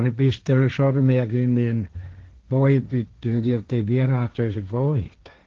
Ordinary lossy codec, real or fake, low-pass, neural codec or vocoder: Opus, 16 kbps; fake; 7.2 kHz; codec, 16 kHz, 1.1 kbps, Voila-Tokenizer